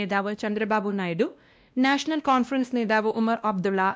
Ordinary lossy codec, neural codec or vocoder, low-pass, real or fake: none; codec, 16 kHz, 1 kbps, X-Codec, WavLM features, trained on Multilingual LibriSpeech; none; fake